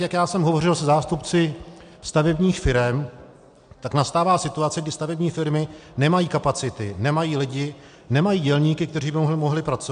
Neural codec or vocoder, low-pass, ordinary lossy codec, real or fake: none; 9.9 kHz; MP3, 64 kbps; real